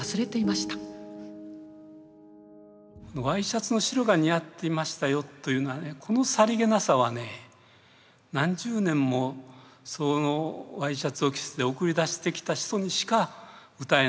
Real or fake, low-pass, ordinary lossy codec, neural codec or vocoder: real; none; none; none